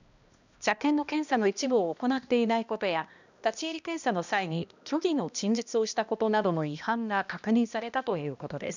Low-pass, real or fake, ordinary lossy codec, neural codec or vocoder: 7.2 kHz; fake; none; codec, 16 kHz, 1 kbps, X-Codec, HuBERT features, trained on balanced general audio